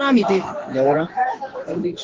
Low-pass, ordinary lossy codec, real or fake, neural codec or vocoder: 7.2 kHz; Opus, 16 kbps; fake; codec, 16 kHz in and 24 kHz out, 1 kbps, XY-Tokenizer